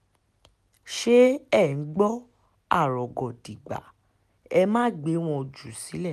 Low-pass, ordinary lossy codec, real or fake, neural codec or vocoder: 14.4 kHz; none; real; none